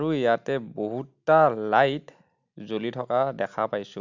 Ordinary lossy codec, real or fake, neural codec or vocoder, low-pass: none; real; none; 7.2 kHz